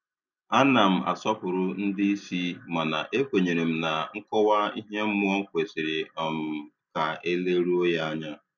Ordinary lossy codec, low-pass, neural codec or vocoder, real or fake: none; 7.2 kHz; none; real